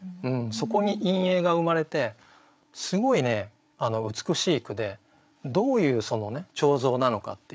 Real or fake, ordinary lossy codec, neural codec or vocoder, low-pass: fake; none; codec, 16 kHz, 8 kbps, FreqCodec, larger model; none